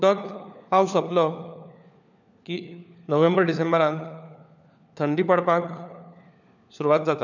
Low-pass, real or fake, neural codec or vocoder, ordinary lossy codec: 7.2 kHz; fake; codec, 16 kHz, 4 kbps, FunCodec, trained on LibriTTS, 50 frames a second; none